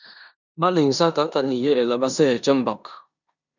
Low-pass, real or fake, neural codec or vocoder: 7.2 kHz; fake; codec, 16 kHz in and 24 kHz out, 0.9 kbps, LongCat-Audio-Codec, four codebook decoder